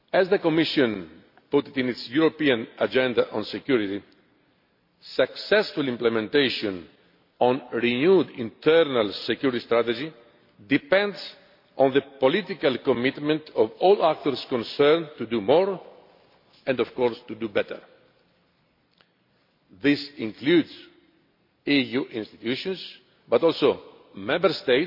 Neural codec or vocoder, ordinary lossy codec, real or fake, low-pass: none; MP3, 32 kbps; real; 5.4 kHz